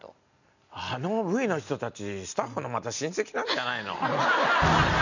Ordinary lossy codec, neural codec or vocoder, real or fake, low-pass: none; none; real; 7.2 kHz